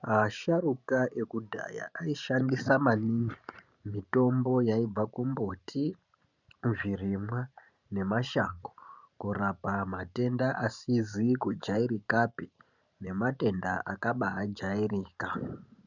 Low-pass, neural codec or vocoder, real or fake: 7.2 kHz; vocoder, 44.1 kHz, 128 mel bands every 512 samples, BigVGAN v2; fake